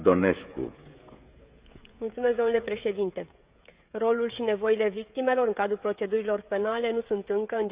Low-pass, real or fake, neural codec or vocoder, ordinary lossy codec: 3.6 kHz; fake; codec, 16 kHz, 16 kbps, FreqCodec, smaller model; Opus, 64 kbps